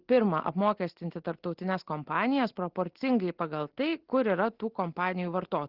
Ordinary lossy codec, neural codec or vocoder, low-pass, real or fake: Opus, 16 kbps; none; 5.4 kHz; real